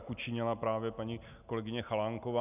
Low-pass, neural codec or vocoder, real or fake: 3.6 kHz; none; real